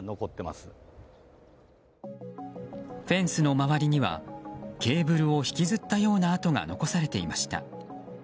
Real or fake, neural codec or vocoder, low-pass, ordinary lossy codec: real; none; none; none